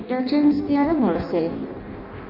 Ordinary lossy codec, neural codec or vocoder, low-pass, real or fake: none; codec, 16 kHz in and 24 kHz out, 0.6 kbps, FireRedTTS-2 codec; 5.4 kHz; fake